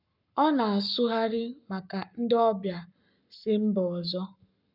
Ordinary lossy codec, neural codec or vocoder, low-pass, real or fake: none; codec, 44.1 kHz, 7.8 kbps, Pupu-Codec; 5.4 kHz; fake